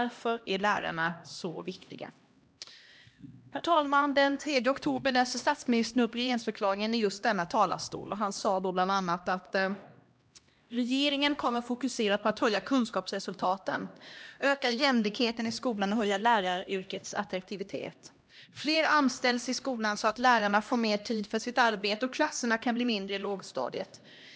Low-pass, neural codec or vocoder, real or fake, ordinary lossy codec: none; codec, 16 kHz, 1 kbps, X-Codec, HuBERT features, trained on LibriSpeech; fake; none